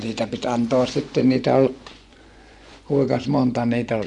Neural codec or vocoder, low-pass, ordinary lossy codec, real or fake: vocoder, 24 kHz, 100 mel bands, Vocos; 10.8 kHz; none; fake